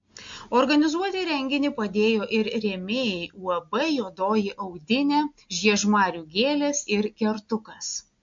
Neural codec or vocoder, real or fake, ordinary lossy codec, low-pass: none; real; MP3, 48 kbps; 7.2 kHz